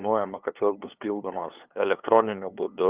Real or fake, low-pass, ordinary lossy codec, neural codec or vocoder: fake; 3.6 kHz; Opus, 24 kbps; codec, 16 kHz, 2 kbps, FunCodec, trained on LibriTTS, 25 frames a second